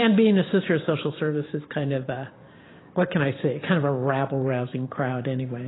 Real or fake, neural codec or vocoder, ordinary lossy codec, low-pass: fake; vocoder, 44.1 kHz, 128 mel bands every 512 samples, BigVGAN v2; AAC, 16 kbps; 7.2 kHz